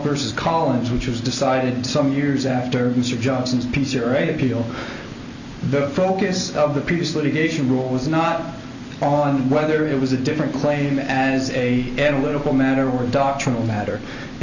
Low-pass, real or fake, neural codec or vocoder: 7.2 kHz; real; none